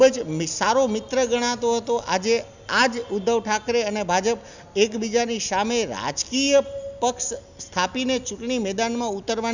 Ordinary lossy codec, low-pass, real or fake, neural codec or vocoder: none; 7.2 kHz; real; none